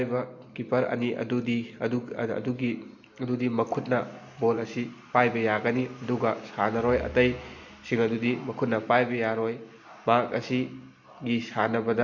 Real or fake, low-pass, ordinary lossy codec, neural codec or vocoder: real; 7.2 kHz; none; none